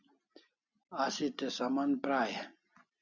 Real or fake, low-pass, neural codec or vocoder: real; 7.2 kHz; none